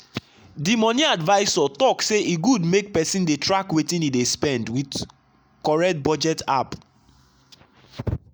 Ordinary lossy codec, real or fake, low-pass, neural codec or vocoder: none; real; none; none